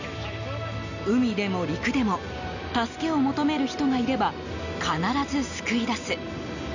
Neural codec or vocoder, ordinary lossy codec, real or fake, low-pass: none; none; real; 7.2 kHz